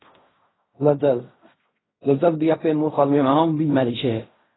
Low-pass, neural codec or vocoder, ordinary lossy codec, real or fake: 7.2 kHz; codec, 16 kHz in and 24 kHz out, 0.4 kbps, LongCat-Audio-Codec, fine tuned four codebook decoder; AAC, 16 kbps; fake